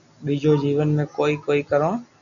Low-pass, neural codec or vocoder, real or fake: 7.2 kHz; none; real